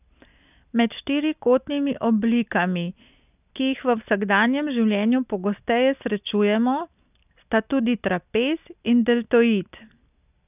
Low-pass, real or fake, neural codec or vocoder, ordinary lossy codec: 3.6 kHz; real; none; none